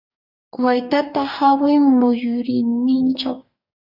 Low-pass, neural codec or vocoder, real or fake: 5.4 kHz; codec, 44.1 kHz, 2.6 kbps, DAC; fake